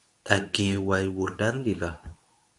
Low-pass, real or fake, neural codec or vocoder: 10.8 kHz; fake; codec, 24 kHz, 0.9 kbps, WavTokenizer, medium speech release version 2